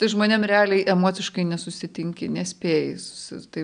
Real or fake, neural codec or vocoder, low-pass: real; none; 9.9 kHz